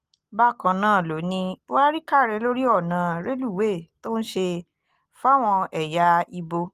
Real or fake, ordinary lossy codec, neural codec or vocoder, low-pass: real; Opus, 24 kbps; none; 14.4 kHz